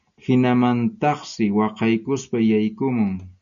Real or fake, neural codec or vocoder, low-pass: real; none; 7.2 kHz